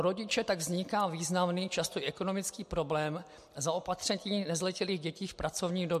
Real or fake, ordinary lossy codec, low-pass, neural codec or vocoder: real; MP3, 64 kbps; 14.4 kHz; none